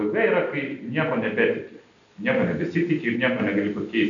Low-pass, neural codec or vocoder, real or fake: 7.2 kHz; none; real